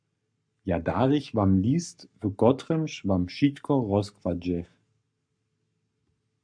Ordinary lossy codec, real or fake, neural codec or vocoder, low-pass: MP3, 96 kbps; fake; codec, 44.1 kHz, 7.8 kbps, Pupu-Codec; 9.9 kHz